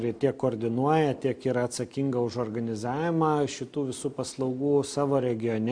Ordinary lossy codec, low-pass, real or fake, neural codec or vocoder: MP3, 64 kbps; 9.9 kHz; real; none